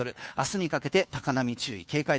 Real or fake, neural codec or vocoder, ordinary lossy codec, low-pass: fake; codec, 16 kHz, 2 kbps, FunCodec, trained on Chinese and English, 25 frames a second; none; none